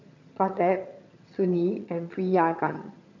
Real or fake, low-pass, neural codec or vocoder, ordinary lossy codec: fake; 7.2 kHz; vocoder, 22.05 kHz, 80 mel bands, HiFi-GAN; MP3, 48 kbps